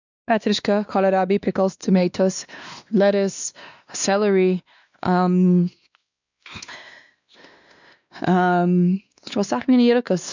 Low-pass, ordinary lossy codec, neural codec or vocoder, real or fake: 7.2 kHz; none; codec, 16 kHz, 2 kbps, X-Codec, WavLM features, trained on Multilingual LibriSpeech; fake